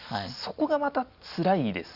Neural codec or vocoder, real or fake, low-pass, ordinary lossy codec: none; real; 5.4 kHz; Opus, 64 kbps